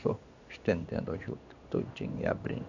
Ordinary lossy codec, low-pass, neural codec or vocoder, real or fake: none; 7.2 kHz; codec, 16 kHz in and 24 kHz out, 1 kbps, XY-Tokenizer; fake